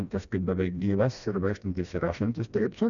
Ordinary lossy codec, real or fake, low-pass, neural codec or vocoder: MP3, 96 kbps; fake; 7.2 kHz; codec, 16 kHz, 1 kbps, FreqCodec, smaller model